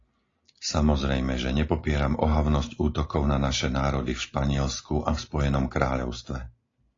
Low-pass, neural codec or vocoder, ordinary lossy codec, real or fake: 7.2 kHz; none; AAC, 32 kbps; real